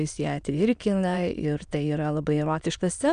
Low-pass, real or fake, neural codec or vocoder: 9.9 kHz; fake; autoencoder, 22.05 kHz, a latent of 192 numbers a frame, VITS, trained on many speakers